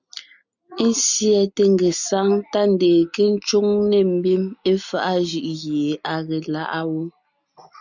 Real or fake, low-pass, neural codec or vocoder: real; 7.2 kHz; none